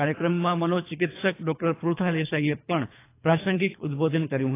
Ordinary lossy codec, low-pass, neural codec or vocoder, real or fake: AAC, 24 kbps; 3.6 kHz; codec, 24 kHz, 3 kbps, HILCodec; fake